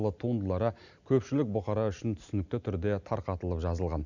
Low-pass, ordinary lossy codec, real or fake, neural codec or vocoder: 7.2 kHz; none; real; none